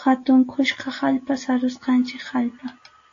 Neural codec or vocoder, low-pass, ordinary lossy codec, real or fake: none; 7.2 kHz; AAC, 48 kbps; real